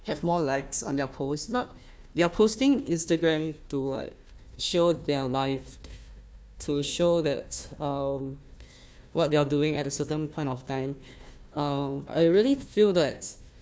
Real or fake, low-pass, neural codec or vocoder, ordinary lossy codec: fake; none; codec, 16 kHz, 1 kbps, FunCodec, trained on Chinese and English, 50 frames a second; none